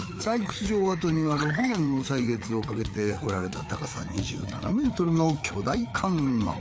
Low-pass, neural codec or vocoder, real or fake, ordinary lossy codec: none; codec, 16 kHz, 4 kbps, FreqCodec, larger model; fake; none